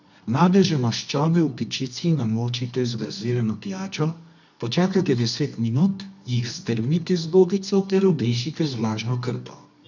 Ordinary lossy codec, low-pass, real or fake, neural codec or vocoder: none; 7.2 kHz; fake; codec, 24 kHz, 0.9 kbps, WavTokenizer, medium music audio release